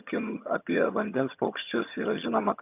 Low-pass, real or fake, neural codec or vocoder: 3.6 kHz; fake; vocoder, 22.05 kHz, 80 mel bands, HiFi-GAN